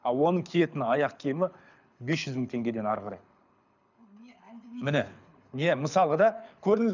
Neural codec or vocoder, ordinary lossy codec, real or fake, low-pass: codec, 24 kHz, 6 kbps, HILCodec; none; fake; 7.2 kHz